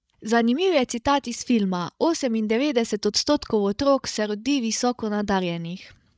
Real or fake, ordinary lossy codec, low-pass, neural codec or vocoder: fake; none; none; codec, 16 kHz, 16 kbps, FreqCodec, larger model